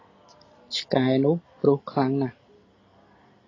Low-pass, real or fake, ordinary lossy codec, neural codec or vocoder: 7.2 kHz; real; AAC, 32 kbps; none